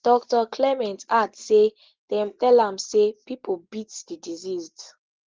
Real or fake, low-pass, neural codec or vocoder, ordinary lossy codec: real; 7.2 kHz; none; Opus, 16 kbps